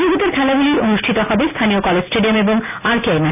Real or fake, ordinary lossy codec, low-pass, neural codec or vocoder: real; none; 3.6 kHz; none